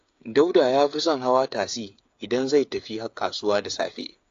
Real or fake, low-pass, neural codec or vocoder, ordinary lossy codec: fake; 7.2 kHz; codec, 16 kHz, 8 kbps, FreqCodec, smaller model; AAC, 48 kbps